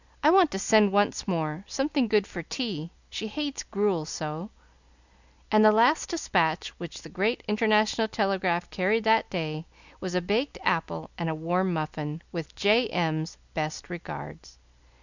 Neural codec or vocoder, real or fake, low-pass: none; real; 7.2 kHz